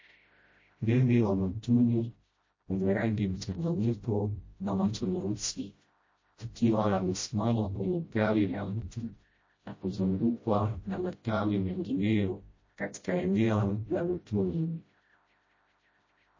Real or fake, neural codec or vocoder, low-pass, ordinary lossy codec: fake; codec, 16 kHz, 0.5 kbps, FreqCodec, smaller model; 7.2 kHz; MP3, 32 kbps